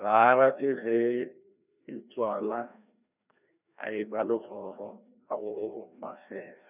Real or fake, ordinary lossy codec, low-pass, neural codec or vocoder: fake; none; 3.6 kHz; codec, 16 kHz, 1 kbps, FreqCodec, larger model